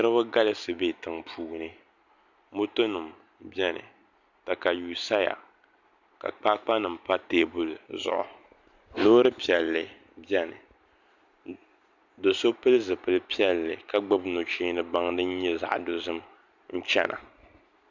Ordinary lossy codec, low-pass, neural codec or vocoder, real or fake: Opus, 64 kbps; 7.2 kHz; none; real